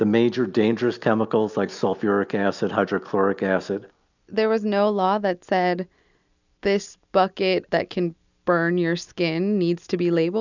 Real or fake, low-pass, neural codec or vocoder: real; 7.2 kHz; none